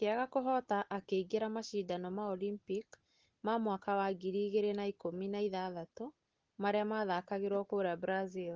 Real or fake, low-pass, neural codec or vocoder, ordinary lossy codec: real; 7.2 kHz; none; Opus, 16 kbps